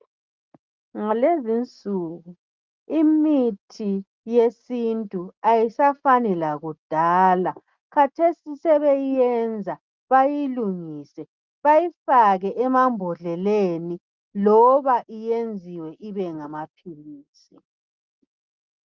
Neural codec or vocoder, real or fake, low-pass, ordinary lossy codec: none; real; 7.2 kHz; Opus, 32 kbps